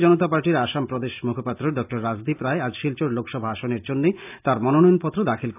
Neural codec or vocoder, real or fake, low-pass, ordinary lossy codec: none; real; 3.6 kHz; AAC, 32 kbps